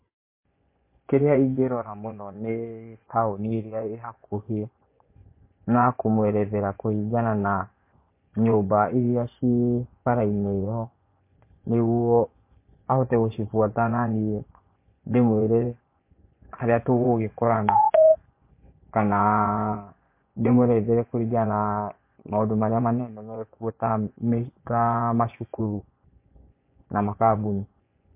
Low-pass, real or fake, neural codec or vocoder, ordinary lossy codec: 3.6 kHz; fake; vocoder, 24 kHz, 100 mel bands, Vocos; MP3, 24 kbps